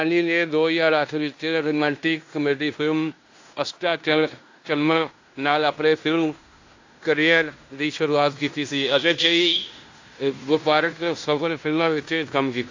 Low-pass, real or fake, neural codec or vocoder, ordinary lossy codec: 7.2 kHz; fake; codec, 16 kHz in and 24 kHz out, 0.9 kbps, LongCat-Audio-Codec, fine tuned four codebook decoder; AAC, 48 kbps